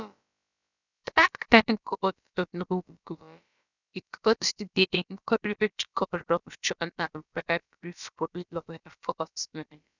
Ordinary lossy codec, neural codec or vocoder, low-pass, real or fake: none; codec, 16 kHz, about 1 kbps, DyCAST, with the encoder's durations; 7.2 kHz; fake